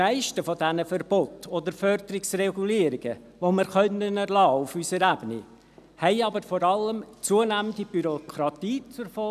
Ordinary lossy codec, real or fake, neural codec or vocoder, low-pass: none; real; none; 14.4 kHz